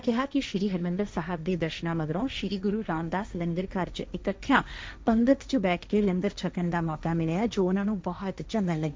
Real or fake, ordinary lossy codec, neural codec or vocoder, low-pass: fake; none; codec, 16 kHz, 1.1 kbps, Voila-Tokenizer; none